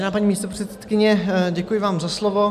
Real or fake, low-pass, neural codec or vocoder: real; 14.4 kHz; none